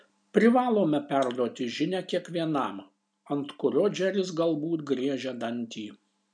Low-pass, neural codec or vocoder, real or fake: 9.9 kHz; none; real